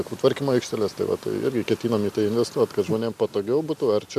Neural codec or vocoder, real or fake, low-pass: none; real; 14.4 kHz